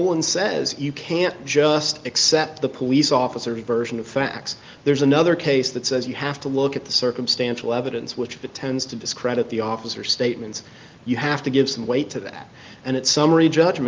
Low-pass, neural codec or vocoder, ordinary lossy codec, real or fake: 7.2 kHz; none; Opus, 32 kbps; real